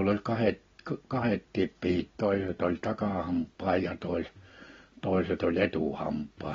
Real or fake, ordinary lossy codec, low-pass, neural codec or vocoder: real; AAC, 24 kbps; 7.2 kHz; none